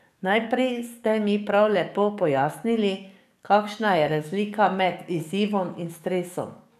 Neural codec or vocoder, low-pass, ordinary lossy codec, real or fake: codec, 44.1 kHz, 7.8 kbps, DAC; 14.4 kHz; none; fake